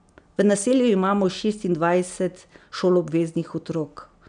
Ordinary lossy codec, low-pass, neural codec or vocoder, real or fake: none; 9.9 kHz; none; real